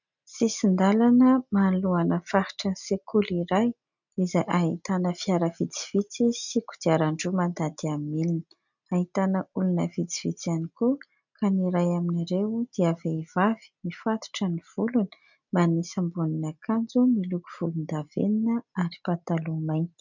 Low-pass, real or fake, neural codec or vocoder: 7.2 kHz; real; none